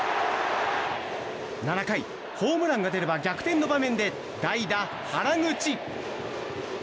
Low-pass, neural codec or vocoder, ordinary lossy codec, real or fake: none; none; none; real